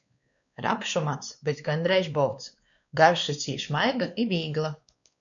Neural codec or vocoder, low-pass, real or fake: codec, 16 kHz, 2 kbps, X-Codec, WavLM features, trained on Multilingual LibriSpeech; 7.2 kHz; fake